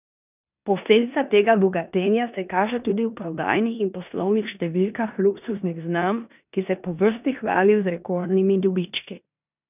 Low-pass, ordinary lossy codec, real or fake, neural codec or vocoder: 3.6 kHz; none; fake; codec, 16 kHz in and 24 kHz out, 0.9 kbps, LongCat-Audio-Codec, four codebook decoder